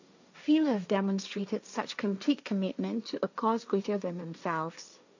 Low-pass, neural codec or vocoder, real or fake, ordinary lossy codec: 7.2 kHz; codec, 16 kHz, 1.1 kbps, Voila-Tokenizer; fake; none